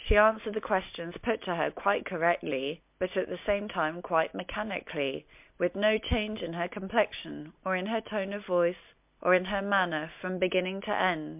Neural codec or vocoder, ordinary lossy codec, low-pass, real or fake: none; MP3, 32 kbps; 3.6 kHz; real